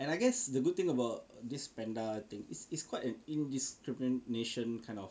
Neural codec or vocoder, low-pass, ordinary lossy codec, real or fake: none; none; none; real